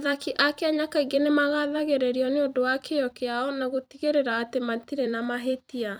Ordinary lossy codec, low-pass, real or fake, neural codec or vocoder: none; none; fake; vocoder, 44.1 kHz, 128 mel bands every 256 samples, BigVGAN v2